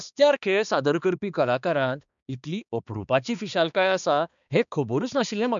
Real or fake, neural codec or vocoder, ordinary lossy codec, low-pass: fake; codec, 16 kHz, 2 kbps, X-Codec, HuBERT features, trained on balanced general audio; MP3, 96 kbps; 7.2 kHz